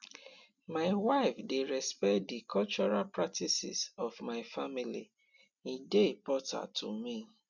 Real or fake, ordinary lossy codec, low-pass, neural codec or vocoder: real; none; 7.2 kHz; none